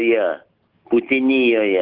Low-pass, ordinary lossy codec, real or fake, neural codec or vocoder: 5.4 kHz; Opus, 16 kbps; real; none